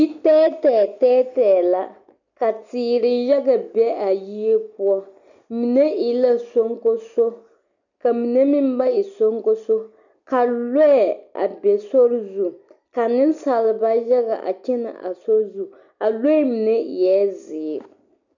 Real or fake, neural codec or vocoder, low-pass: real; none; 7.2 kHz